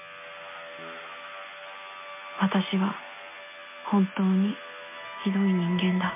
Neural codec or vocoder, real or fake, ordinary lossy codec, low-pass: none; real; MP3, 24 kbps; 3.6 kHz